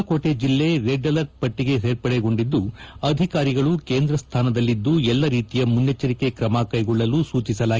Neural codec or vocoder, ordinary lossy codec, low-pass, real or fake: none; Opus, 24 kbps; 7.2 kHz; real